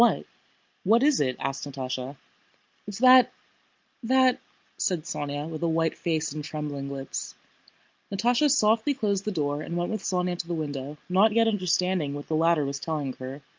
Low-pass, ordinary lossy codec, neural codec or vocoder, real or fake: 7.2 kHz; Opus, 24 kbps; none; real